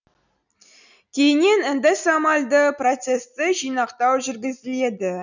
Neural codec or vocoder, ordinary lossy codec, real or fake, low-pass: none; none; real; 7.2 kHz